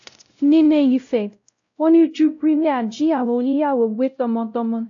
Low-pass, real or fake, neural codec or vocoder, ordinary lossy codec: 7.2 kHz; fake; codec, 16 kHz, 0.5 kbps, X-Codec, WavLM features, trained on Multilingual LibriSpeech; AAC, 64 kbps